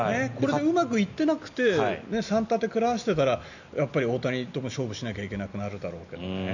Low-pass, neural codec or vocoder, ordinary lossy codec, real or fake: 7.2 kHz; none; none; real